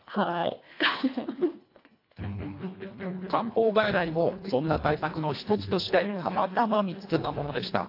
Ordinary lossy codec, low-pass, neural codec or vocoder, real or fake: AAC, 32 kbps; 5.4 kHz; codec, 24 kHz, 1.5 kbps, HILCodec; fake